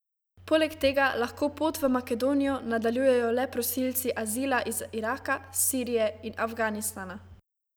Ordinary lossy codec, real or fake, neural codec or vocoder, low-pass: none; real; none; none